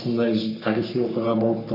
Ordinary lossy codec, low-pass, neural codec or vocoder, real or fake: none; 5.4 kHz; codec, 44.1 kHz, 3.4 kbps, Pupu-Codec; fake